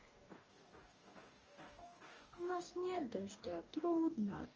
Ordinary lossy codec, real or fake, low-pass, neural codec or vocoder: Opus, 24 kbps; fake; 7.2 kHz; codec, 44.1 kHz, 2.6 kbps, DAC